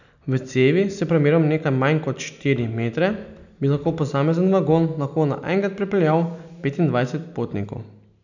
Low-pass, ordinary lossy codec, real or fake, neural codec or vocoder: 7.2 kHz; none; real; none